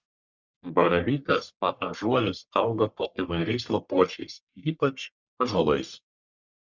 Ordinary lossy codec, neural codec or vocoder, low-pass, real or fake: Opus, 64 kbps; codec, 44.1 kHz, 1.7 kbps, Pupu-Codec; 7.2 kHz; fake